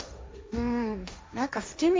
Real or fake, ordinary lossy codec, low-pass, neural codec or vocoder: fake; none; none; codec, 16 kHz, 1.1 kbps, Voila-Tokenizer